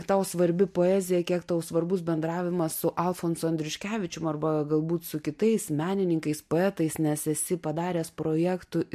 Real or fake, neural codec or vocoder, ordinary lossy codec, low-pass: real; none; MP3, 64 kbps; 14.4 kHz